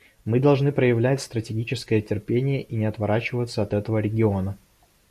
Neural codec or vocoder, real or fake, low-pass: none; real; 14.4 kHz